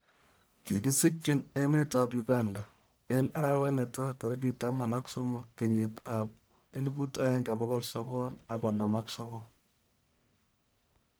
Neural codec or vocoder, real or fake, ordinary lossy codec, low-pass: codec, 44.1 kHz, 1.7 kbps, Pupu-Codec; fake; none; none